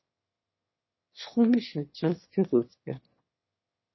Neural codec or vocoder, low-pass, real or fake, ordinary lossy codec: autoencoder, 22.05 kHz, a latent of 192 numbers a frame, VITS, trained on one speaker; 7.2 kHz; fake; MP3, 24 kbps